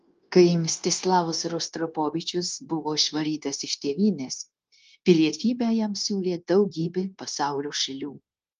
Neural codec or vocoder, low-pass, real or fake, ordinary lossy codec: codec, 16 kHz, 0.9 kbps, LongCat-Audio-Codec; 7.2 kHz; fake; Opus, 24 kbps